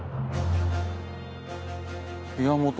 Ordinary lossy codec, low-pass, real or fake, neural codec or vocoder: none; none; real; none